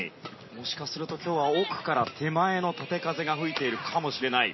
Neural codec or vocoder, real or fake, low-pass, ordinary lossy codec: none; real; 7.2 kHz; MP3, 24 kbps